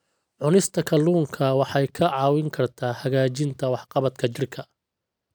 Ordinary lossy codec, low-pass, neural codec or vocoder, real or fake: none; none; none; real